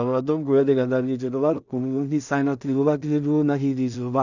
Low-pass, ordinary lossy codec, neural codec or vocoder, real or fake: 7.2 kHz; none; codec, 16 kHz in and 24 kHz out, 0.4 kbps, LongCat-Audio-Codec, two codebook decoder; fake